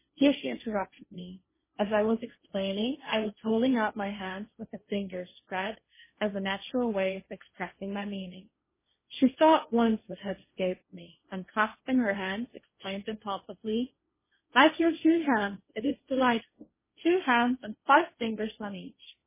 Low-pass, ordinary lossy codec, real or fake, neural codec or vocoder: 3.6 kHz; MP3, 16 kbps; fake; codec, 16 kHz, 1.1 kbps, Voila-Tokenizer